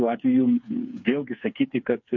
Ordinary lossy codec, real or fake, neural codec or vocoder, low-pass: MP3, 48 kbps; fake; codec, 16 kHz, 4 kbps, FreqCodec, smaller model; 7.2 kHz